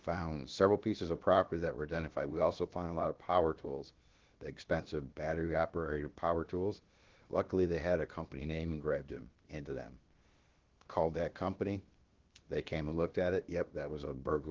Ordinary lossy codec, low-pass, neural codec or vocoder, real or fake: Opus, 16 kbps; 7.2 kHz; codec, 16 kHz, about 1 kbps, DyCAST, with the encoder's durations; fake